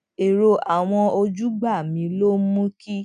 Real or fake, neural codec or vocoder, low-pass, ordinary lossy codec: real; none; 9.9 kHz; none